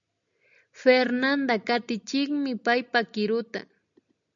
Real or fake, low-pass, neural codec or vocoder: real; 7.2 kHz; none